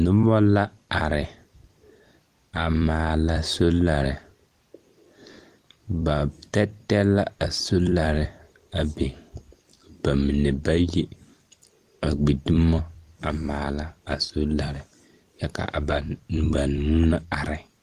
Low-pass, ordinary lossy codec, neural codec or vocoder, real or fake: 14.4 kHz; Opus, 24 kbps; vocoder, 44.1 kHz, 128 mel bands every 256 samples, BigVGAN v2; fake